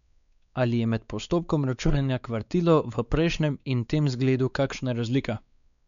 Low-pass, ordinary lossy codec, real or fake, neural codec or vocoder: 7.2 kHz; none; fake; codec, 16 kHz, 4 kbps, X-Codec, WavLM features, trained on Multilingual LibriSpeech